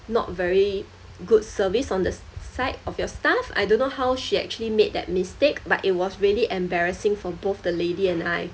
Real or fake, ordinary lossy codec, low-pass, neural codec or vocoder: real; none; none; none